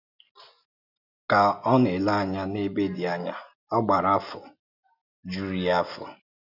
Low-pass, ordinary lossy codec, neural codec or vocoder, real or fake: 5.4 kHz; none; none; real